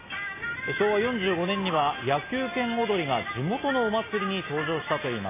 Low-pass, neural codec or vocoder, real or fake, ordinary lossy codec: 3.6 kHz; none; real; MP3, 16 kbps